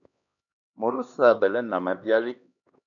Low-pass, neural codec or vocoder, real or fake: 7.2 kHz; codec, 16 kHz, 2 kbps, X-Codec, HuBERT features, trained on LibriSpeech; fake